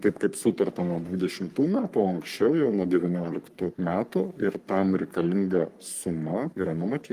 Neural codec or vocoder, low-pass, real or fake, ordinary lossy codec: codec, 44.1 kHz, 3.4 kbps, Pupu-Codec; 14.4 kHz; fake; Opus, 32 kbps